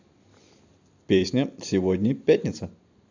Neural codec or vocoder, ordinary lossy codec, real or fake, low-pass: vocoder, 44.1 kHz, 128 mel bands every 256 samples, BigVGAN v2; AAC, 48 kbps; fake; 7.2 kHz